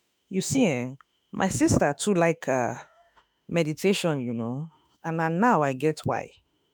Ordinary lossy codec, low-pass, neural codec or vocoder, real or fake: none; none; autoencoder, 48 kHz, 32 numbers a frame, DAC-VAE, trained on Japanese speech; fake